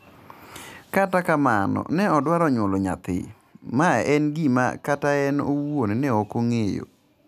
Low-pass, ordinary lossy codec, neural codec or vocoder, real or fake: 14.4 kHz; none; none; real